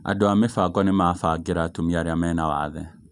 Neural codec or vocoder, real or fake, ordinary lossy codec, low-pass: none; real; none; 10.8 kHz